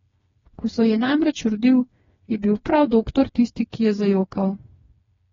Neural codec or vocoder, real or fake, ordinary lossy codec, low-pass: codec, 16 kHz, 4 kbps, FreqCodec, smaller model; fake; AAC, 24 kbps; 7.2 kHz